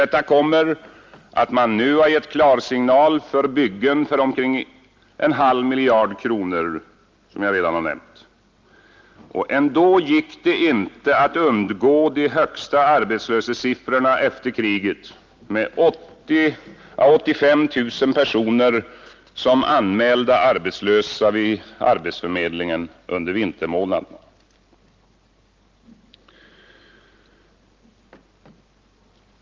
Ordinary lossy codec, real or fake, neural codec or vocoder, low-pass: Opus, 32 kbps; real; none; 7.2 kHz